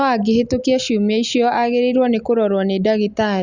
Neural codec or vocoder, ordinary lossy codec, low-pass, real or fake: none; Opus, 64 kbps; 7.2 kHz; real